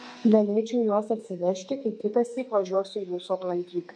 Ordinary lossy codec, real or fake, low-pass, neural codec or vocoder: MP3, 48 kbps; fake; 9.9 kHz; codec, 32 kHz, 1.9 kbps, SNAC